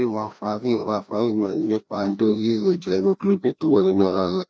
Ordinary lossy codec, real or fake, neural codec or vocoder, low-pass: none; fake; codec, 16 kHz, 1 kbps, FreqCodec, larger model; none